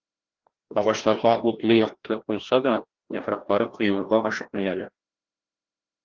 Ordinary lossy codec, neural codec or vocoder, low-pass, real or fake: Opus, 16 kbps; codec, 16 kHz, 1 kbps, FreqCodec, larger model; 7.2 kHz; fake